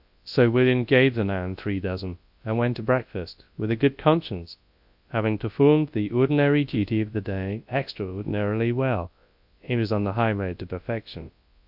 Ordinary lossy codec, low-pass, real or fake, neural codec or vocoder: AAC, 48 kbps; 5.4 kHz; fake; codec, 24 kHz, 0.9 kbps, WavTokenizer, large speech release